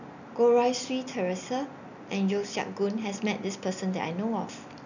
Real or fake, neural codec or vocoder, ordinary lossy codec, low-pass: real; none; none; 7.2 kHz